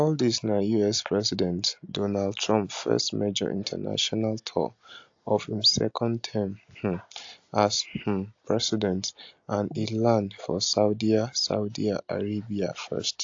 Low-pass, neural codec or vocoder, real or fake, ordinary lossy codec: 7.2 kHz; none; real; AAC, 48 kbps